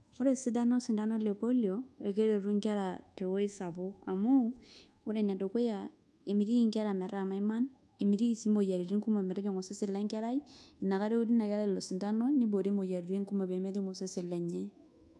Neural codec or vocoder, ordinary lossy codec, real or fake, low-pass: codec, 24 kHz, 1.2 kbps, DualCodec; none; fake; none